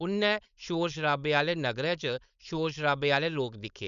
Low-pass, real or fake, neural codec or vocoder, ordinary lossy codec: 7.2 kHz; fake; codec, 16 kHz, 4.8 kbps, FACodec; none